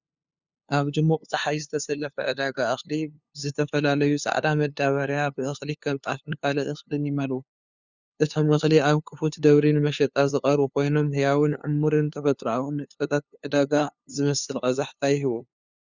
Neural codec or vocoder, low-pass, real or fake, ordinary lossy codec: codec, 16 kHz, 2 kbps, FunCodec, trained on LibriTTS, 25 frames a second; 7.2 kHz; fake; Opus, 64 kbps